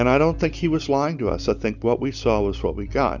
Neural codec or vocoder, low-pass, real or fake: none; 7.2 kHz; real